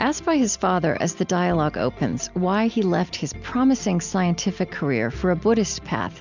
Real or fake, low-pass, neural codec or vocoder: real; 7.2 kHz; none